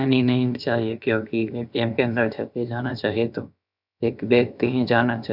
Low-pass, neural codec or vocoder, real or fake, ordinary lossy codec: 5.4 kHz; codec, 16 kHz, about 1 kbps, DyCAST, with the encoder's durations; fake; none